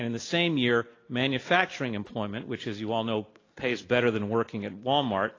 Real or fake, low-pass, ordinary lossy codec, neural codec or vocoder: real; 7.2 kHz; AAC, 32 kbps; none